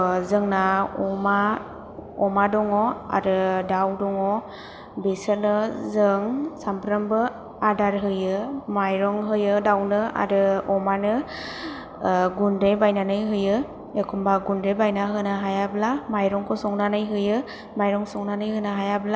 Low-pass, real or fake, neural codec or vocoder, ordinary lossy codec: none; real; none; none